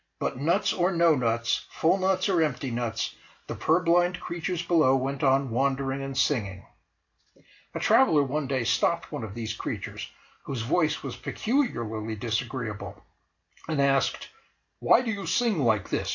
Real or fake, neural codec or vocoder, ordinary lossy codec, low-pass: real; none; AAC, 48 kbps; 7.2 kHz